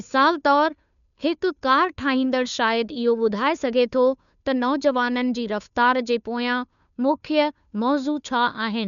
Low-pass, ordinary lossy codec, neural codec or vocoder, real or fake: 7.2 kHz; none; codec, 16 kHz, 2 kbps, FunCodec, trained on Chinese and English, 25 frames a second; fake